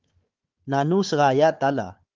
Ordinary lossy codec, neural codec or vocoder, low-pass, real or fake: Opus, 32 kbps; codec, 16 kHz, 4 kbps, FunCodec, trained on Chinese and English, 50 frames a second; 7.2 kHz; fake